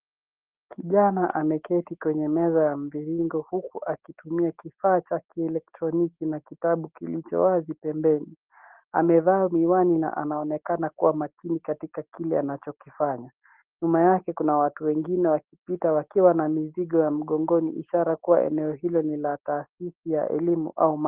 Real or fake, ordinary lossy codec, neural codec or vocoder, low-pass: real; Opus, 16 kbps; none; 3.6 kHz